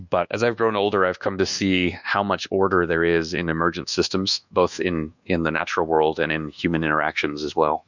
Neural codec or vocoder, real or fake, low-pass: codec, 24 kHz, 1.2 kbps, DualCodec; fake; 7.2 kHz